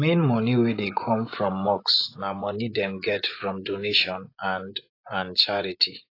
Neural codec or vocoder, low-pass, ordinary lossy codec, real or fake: vocoder, 24 kHz, 100 mel bands, Vocos; 5.4 kHz; AAC, 32 kbps; fake